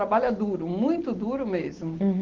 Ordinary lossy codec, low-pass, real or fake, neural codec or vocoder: Opus, 16 kbps; 7.2 kHz; real; none